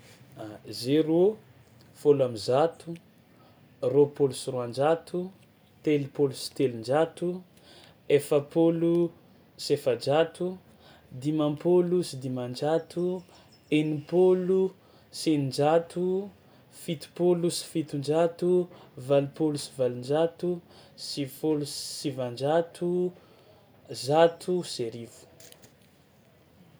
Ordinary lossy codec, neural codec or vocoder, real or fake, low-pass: none; none; real; none